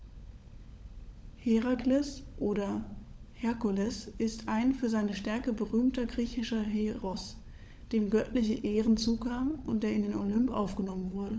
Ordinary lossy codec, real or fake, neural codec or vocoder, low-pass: none; fake; codec, 16 kHz, 16 kbps, FunCodec, trained on LibriTTS, 50 frames a second; none